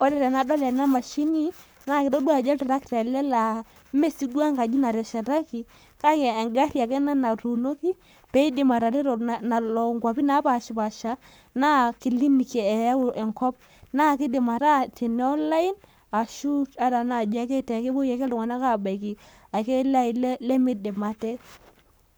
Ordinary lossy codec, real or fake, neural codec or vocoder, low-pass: none; fake; codec, 44.1 kHz, 7.8 kbps, Pupu-Codec; none